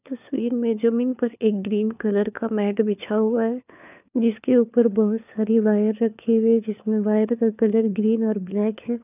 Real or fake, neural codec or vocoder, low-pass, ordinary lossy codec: fake; codec, 16 kHz, 4 kbps, FunCodec, trained on LibriTTS, 50 frames a second; 3.6 kHz; none